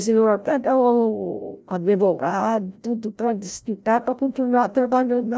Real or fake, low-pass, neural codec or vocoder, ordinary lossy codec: fake; none; codec, 16 kHz, 0.5 kbps, FreqCodec, larger model; none